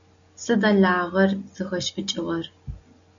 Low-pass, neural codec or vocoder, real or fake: 7.2 kHz; none; real